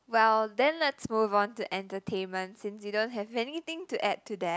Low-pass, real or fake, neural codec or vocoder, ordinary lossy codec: none; real; none; none